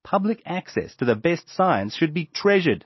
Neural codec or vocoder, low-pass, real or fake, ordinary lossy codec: none; 7.2 kHz; real; MP3, 24 kbps